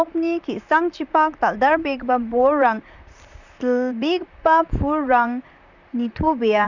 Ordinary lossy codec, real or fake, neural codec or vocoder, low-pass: none; fake; vocoder, 44.1 kHz, 128 mel bands, Pupu-Vocoder; 7.2 kHz